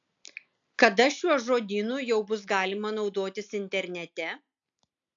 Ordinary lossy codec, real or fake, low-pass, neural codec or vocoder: AAC, 64 kbps; real; 7.2 kHz; none